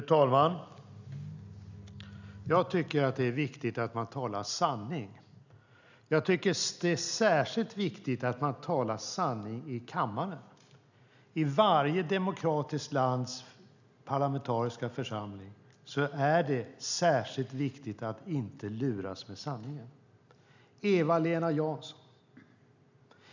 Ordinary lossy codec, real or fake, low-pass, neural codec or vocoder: none; real; 7.2 kHz; none